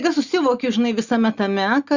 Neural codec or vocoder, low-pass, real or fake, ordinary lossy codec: none; 7.2 kHz; real; Opus, 64 kbps